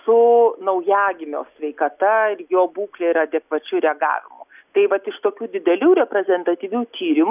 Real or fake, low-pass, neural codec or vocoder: real; 3.6 kHz; none